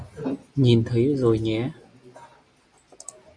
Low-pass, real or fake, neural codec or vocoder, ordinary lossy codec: 9.9 kHz; real; none; Opus, 64 kbps